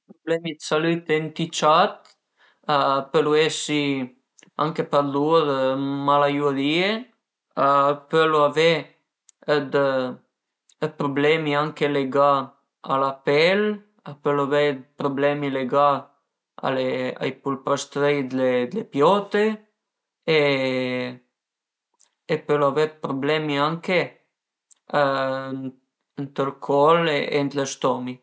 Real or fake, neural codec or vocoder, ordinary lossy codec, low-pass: real; none; none; none